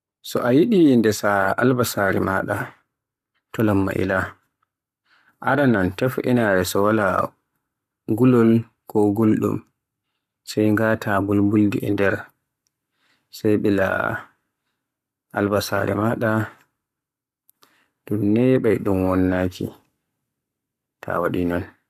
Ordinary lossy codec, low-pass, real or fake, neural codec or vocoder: none; 14.4 kHz; fake; codec, 44.1 kHz, 7.8 kbps, Pupu-Codec